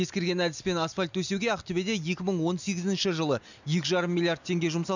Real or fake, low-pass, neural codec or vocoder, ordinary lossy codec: real; 7.2 kHz; none; none